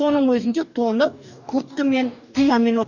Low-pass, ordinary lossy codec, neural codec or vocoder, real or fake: 7.2 kHz; none; codec, 44.1 kHz, 2.6 kbps, DAC; fake